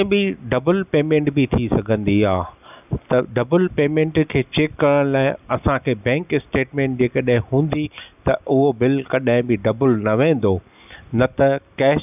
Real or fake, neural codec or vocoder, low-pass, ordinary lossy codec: real; none; 3.6 kHz; none